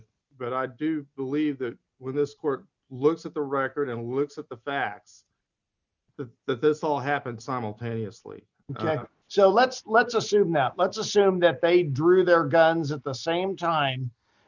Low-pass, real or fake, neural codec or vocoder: 7.2 kHz; real; none